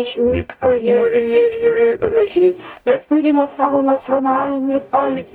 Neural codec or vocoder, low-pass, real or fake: codec, 44.1 kHz, 0.9 kbps, DAC; 19.8 kHz; fake